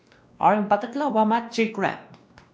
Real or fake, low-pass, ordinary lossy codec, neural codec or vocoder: fake; none; none; codec, 16 kHz, 1 kbps, X-Codec, WavLM features, trained on Multilingual LibriSpeech